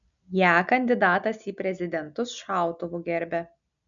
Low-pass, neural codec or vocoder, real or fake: 7.2 kHz; none; real